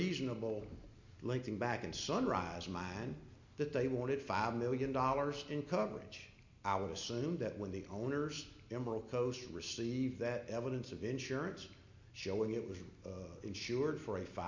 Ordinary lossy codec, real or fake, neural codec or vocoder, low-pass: MP3, 48 kbps; real; none; 7.2 kHz